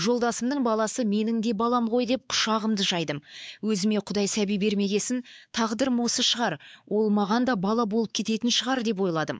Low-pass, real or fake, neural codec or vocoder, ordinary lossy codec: none; fake; codec, 16 kHz, 4 kbps, X-Codec, WavLM features, trained on Multilingual LibriSpeech; none